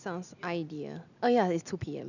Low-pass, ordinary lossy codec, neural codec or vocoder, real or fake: 7.2 kHz; none; none; real